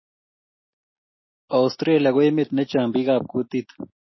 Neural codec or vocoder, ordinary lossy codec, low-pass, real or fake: none; MP3, 24 kbps; 7.2 kHz; real